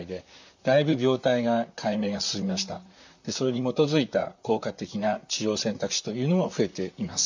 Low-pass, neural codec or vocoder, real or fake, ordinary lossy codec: 7.2 kHz; vocoder, 44.1 kHz, 128 mel bands, Pupu-Vocoder; fake; none